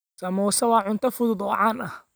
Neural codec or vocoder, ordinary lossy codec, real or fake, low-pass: none; none; real; none